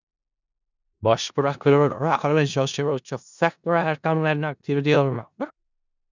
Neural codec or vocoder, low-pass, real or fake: codec, 16 kHz in and 24 kHz out, 0.4 kbps, LongCat-Audio-Codec, four codebook decoder; 7.2 kHz; fake